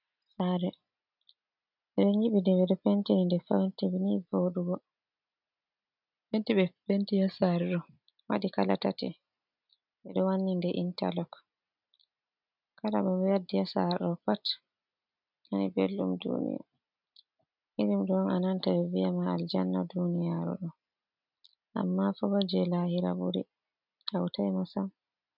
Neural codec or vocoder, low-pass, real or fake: none; 5.4 kHz; real